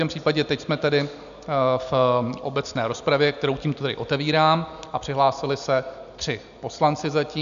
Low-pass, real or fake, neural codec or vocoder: 7.2 kHz; real; none